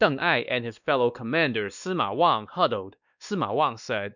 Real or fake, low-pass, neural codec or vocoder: fake; 7.2 kHz; codec, 16 kHz, 2 kbps, X-Codec, WavLM features, trained on Multilingual LibriSpeech